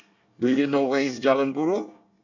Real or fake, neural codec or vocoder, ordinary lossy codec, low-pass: fake; codec, 24 kHz, 1 kbps, SNAC; none; 7.2 kHz